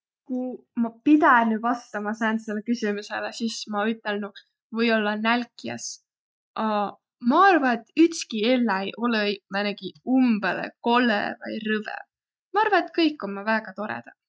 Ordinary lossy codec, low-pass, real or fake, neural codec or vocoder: none; none; real; none